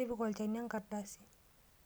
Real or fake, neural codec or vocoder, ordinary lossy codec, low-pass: real; none; none; none